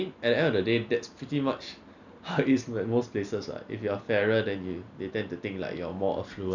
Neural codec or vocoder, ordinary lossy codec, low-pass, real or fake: none; none; 7.2 kHz; real